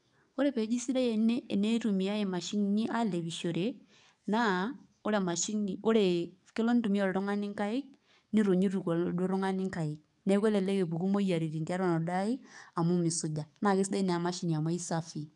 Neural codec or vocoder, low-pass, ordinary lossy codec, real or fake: codec, 44.1 kHz, 7.8 kbps, DAC; 10.8 kHz; none; fake